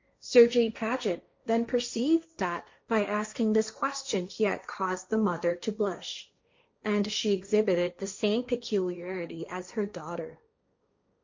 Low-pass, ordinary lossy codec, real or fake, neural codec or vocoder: 7.2 kHz; MP3, 48 kbps; fake; codec, 16 kHz, 1.1 kbps, Voila-Tokenizer